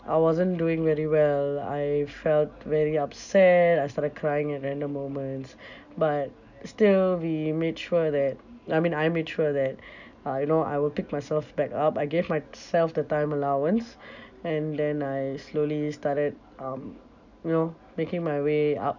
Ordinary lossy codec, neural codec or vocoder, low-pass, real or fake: none; none; 7.2 kHz; real